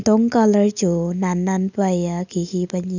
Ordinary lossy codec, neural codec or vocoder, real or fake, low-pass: none; none; real; 7.2 kHz